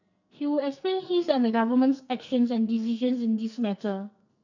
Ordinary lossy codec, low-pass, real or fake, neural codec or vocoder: none; 7.2 kHz; fake; codec, 44.1 kHz, 2.6 kbps, SNAC